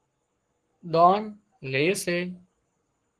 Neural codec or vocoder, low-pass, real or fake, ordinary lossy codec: codec, 44.1 kHz, 7.8 kbps, Pupu-Codec; 10.8 kHz; fake; Opus, 16 kbps